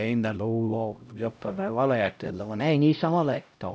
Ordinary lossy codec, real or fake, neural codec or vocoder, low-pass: none; fake; codec, 16 kHz, 0.5 kbps, X-Codec, HuBERT features, trained on LibriSpeech; none